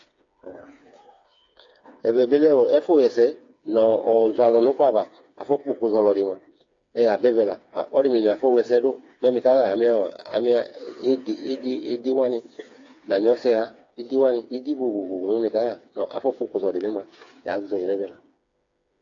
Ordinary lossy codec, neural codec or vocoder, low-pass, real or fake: AAC, 48 kbps; codec, 16 kHz, 4 kbps, FreqCodec, smaller model; 7.2 kHz; fake